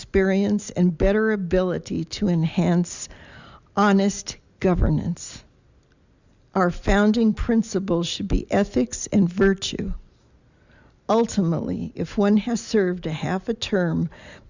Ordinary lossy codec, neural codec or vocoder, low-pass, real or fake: Opus, 64 kbps; none; 7.2 kHz; real